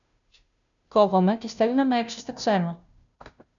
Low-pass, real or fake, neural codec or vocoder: 7.2 kHz; fake; codec, 16 kHz, 0.5 kbps, FunCodec, trained on Chinese and English, 25 frames a second